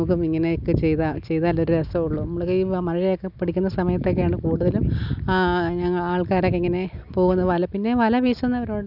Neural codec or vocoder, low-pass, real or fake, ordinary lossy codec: none; 5.4 kHz; real; none